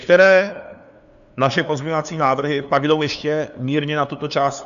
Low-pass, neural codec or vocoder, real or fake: 7.2 kHz; codec, 16 kHz, 2 kbps, FunCodec, trained on LibriTTS, 25 frames a second; fake